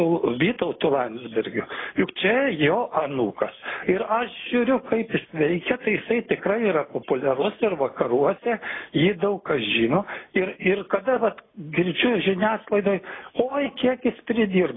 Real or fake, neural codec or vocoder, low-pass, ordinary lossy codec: real; none; 7.2 kHz; AAC, 16 kbps